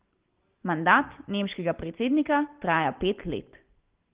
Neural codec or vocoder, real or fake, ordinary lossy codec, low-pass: none; real; Opus, 16 kbps; 3.6 kHz